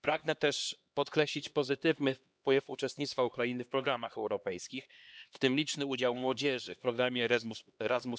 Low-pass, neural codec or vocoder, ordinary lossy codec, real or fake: none; codec, 16 kHz, 2 kbps, X-Codec, HuBERT features, trained on LibriSpeech; none; fake